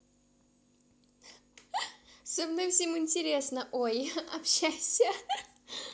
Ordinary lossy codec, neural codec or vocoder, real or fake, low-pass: none; none; real; none